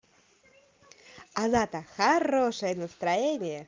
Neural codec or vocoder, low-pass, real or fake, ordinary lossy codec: none; 7.2 kHz; real; Opus, 24 kbps